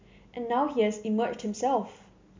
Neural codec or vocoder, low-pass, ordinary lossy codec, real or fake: none; 7.2 kHz; MP3, 64 kbps; real